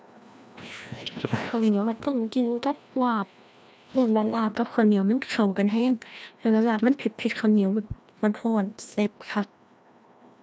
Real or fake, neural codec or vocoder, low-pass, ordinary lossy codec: fake; codec, 16 kHz, 1 kbps, FreqCodec, larger model; none; none